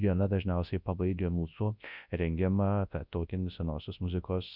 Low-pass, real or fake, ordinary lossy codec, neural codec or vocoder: 5.4 kHz; fake; Opus, 64 kbps; codec, 24 kHz, 0.9 kbps, WavTokenizer, large speech release